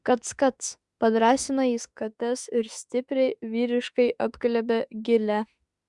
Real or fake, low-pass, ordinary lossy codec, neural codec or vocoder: fake; 10.8 kHz; Opus, 64 kbps; autoencoder, 48 kHz, 32 numbers a frame, DAC-VAE, trained on Japanese speech